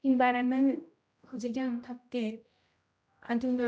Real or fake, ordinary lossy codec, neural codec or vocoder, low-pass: fake; none; codec, 16 kHz, 0.5 kbps, X-Codec, HuBERT features, trained on general audio; none